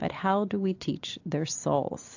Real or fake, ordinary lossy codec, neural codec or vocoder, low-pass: real; AAC, 48 kbps; none; 7.2 kHz